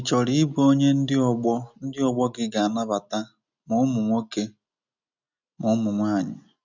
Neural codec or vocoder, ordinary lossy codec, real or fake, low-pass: none; none; real; 7.2 kHz